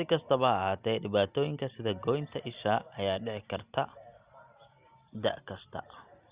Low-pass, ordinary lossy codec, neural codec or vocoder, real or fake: 3.6 kHz; Opus, 64 kbps; none; real